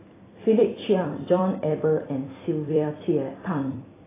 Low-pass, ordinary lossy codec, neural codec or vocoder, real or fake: 3.6 kHz; AAC, 16 kbps; vocoder, 44.1 kHz, 128 mel bands every 256 samples, BigVGAN v2; fake